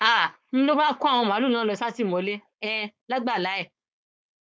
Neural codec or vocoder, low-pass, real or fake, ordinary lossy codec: codec, 16 kHz, 4.8 kbps, FACodec; none; fake; none